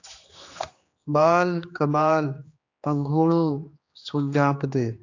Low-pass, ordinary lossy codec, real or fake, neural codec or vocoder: 7.2 kHz; AAC, 48 kbps; fake; codec, 16 kHz, 2 kbps, X-Codec, HuBERT features, trained on general audio